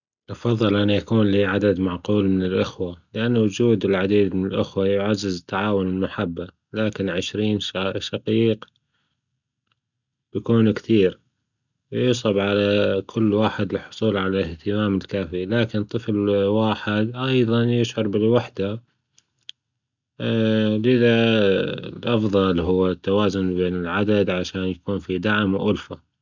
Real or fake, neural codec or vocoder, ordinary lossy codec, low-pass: real; none; none; 7.2 kHz